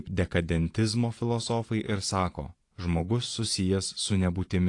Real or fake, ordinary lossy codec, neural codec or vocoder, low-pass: fake; AAC, 48 kbps; vocoder, 24 kHz, 100 mel bands, Vocos; 10.8 kHz